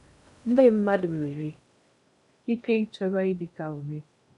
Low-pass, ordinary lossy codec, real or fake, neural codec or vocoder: 10.8 kHz; none; fake; codec, 16 kHz in and 24 kHz out, 0.6 kbps, FocalCodec, streaming, 2048 codes